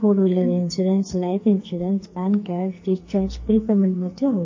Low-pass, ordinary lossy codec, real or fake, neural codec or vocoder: 7.2 kHz; MP3, 32 kbps; fake; codec, 44.1 kHz, 2.6 kbps, SNAC